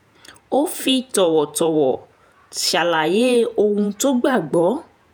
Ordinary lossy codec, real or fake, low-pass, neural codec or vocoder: none; fake; none; vocoder, 48 kHz, 128 mel bands, Vocos